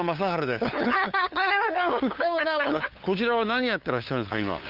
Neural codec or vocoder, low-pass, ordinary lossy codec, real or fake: codec, 16 kHz, 8 kbps, FunCodec, trained on LibriTTS, 25 frames a second; 5.4 kHz; Opus, 24 kbps; fake